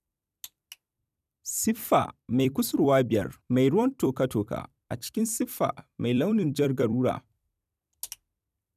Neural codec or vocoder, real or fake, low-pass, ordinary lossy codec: vocoder, 44.1 kHz, 128 mel bands every 256 samples, BigVGAN v2; fake; 14.4 kHz; none